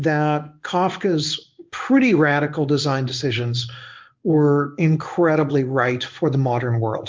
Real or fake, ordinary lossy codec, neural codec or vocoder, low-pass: fake; Opus, 32 kbps; codec, 16 kHz in and 24 kHz out, 1 kbps, XY-Tokenizer; 7.2 kHz